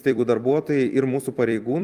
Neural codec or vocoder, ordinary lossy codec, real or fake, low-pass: vocoder, 44.1 kHz, 128 mel bands every 256 samples, BigVGAN v2; Opus, 32 kbps; fake; 14.4 kHz